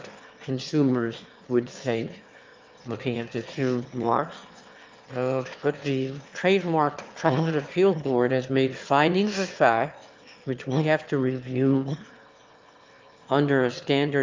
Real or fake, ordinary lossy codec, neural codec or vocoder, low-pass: fake; Opus, 24 kbps; autoencoder, 22.05 kHz, a latent of 192 numbers a frame, VITS, trained on one speaker; 7.2 kHz